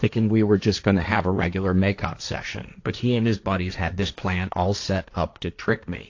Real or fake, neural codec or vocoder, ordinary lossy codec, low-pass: fake; codec, 16 kHz, 1.1 kbps, Voila-Tokenizer; AAC, 48 kbps; 7.2 kHz